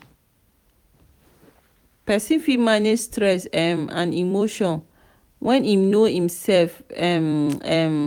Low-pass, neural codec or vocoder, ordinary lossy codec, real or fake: 19.8 kHz; vocoder, 44.1 kHz, 128 mel bands every 256 samples, BigVGAN v2; none; fake